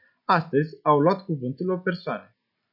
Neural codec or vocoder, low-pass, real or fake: none; 5.4 kHz; real